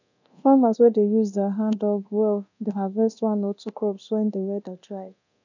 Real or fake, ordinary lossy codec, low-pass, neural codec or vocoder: fake; none; 7.2 kHz; codec, 24 kHz, 0.9 kbps, DualCodec